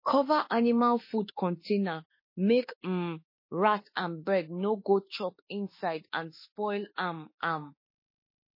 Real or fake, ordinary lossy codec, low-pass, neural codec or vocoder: fake; MP3, 24 kbps; 5.4 kHz; autoencoder, 48 kHz, 32 numbers a frame, DAC-VAE, trained on Japanese speech